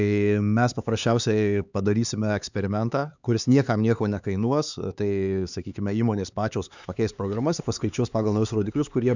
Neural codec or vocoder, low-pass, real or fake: codec, 16 kHz, 4 kbps, X-Codec, HuBERT features, trained on LibriSpeech; 7.2 kHz; fake